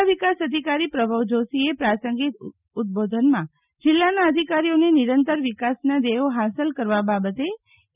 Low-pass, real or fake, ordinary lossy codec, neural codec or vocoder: 3.6 kHz; real; none; none